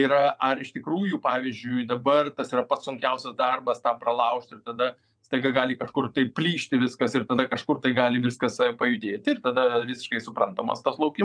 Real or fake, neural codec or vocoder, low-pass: fake; vocoder, 22.05 kHz, 80 mel bands, WaveNeXt; 9.9 kHz